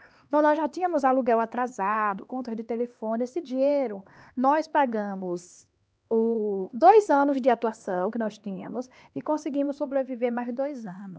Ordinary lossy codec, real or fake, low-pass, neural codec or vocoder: none; fake; none; codec, 16 kHz, 2 kbps, X-Codec, HuBERT features, trained on LibriSpeech